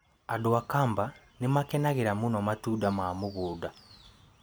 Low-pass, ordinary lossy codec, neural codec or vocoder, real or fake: none; none; none; real